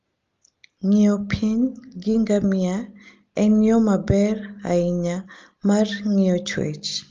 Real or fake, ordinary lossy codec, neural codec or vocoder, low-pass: real; Opus, 32 kbps; none; 7.2 kHz